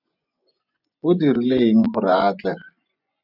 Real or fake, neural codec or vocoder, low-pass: fake; vocoder, 24 kHz, 100 mel bands, Vocos; 5.4 kHz